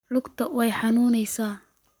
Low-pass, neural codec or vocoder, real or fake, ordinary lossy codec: none; codec, 44.1 kHz, 7.8 kbps, Pupu-Codec; fake; none